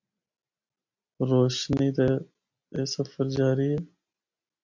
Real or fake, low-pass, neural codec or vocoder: real; 7.2 kHz; none